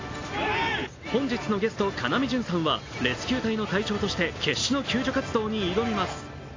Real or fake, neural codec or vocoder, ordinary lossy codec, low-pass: real; none; AAC, 32 kbps; 7.2 kHz